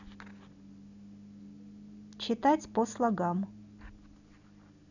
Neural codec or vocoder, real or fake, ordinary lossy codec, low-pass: none; real; none; 7.2 kHz